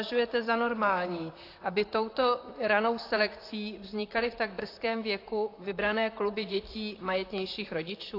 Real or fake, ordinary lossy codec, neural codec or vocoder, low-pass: fake; AAC, 32 kbps; vocoder, 44.1 kHz, 128 mel bands, Pupu-Vocoder; 5.4 kHz